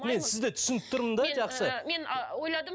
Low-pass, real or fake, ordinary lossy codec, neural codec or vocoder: none; real; none; none